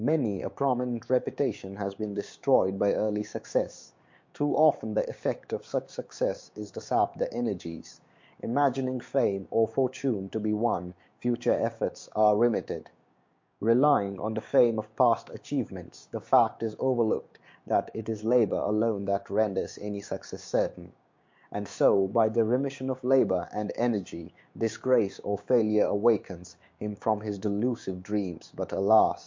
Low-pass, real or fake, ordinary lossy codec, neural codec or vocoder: 7.2 kHz; fake; MP3, 48 kbps; codec, 16 kHz, 6 kbps, DAC